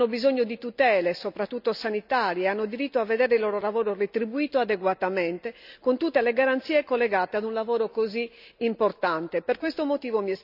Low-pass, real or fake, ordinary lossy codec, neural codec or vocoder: 5.4 kHz; real; none; none